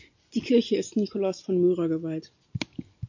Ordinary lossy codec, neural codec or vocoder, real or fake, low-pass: AAC, 48 kbps; none; real; 7.2 kHz